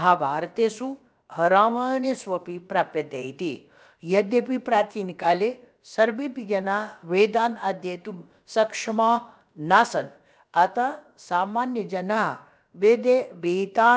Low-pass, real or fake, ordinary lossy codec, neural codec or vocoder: none; fake; none; codec, 16 kHz, about 1 kbps, DyCAST, with the encoder's durations